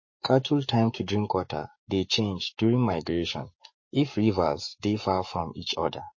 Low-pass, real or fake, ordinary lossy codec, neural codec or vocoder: 7.2 kHz; fake; MP3, 32 kbps; codec, 44.1 kHz, 7.8 kbps, Pupu-Codec